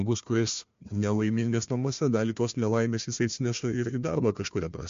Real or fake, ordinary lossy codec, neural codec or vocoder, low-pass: fake; MP3, 48 kbps; codec, 16 kHz, 1 kbps, FunCodec, trained on Chinese and English, 50 frames a second; 7.2 kHz